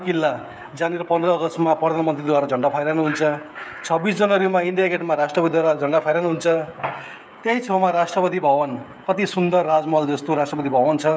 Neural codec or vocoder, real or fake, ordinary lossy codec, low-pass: codec, 16 kHz, 16 kbps, FreqCodec, smaller model; fake; none; none